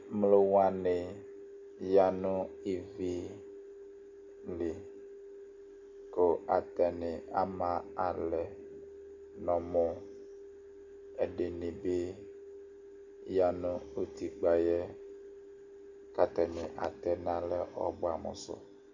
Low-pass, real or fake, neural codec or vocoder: 7.2 kHz; real; none